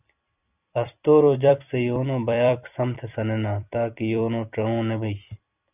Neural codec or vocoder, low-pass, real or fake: vocoder, 44.1 kHz, 128 mel bands every 256 samples, BigVGAN v2; 3.6 kHz; fake